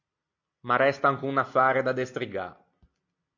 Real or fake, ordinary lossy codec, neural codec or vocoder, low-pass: real; MP3, 48 kbps; none; 7.2 kHz